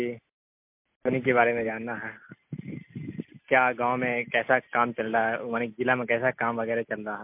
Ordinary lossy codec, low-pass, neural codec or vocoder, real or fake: MP3, 32 kbps; 3.6 kHz; none; real